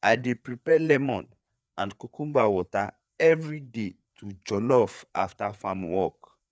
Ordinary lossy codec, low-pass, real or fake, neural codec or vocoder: none; none; fake; codec, 16 kHz, 4 kbps, FreqCodec, larger model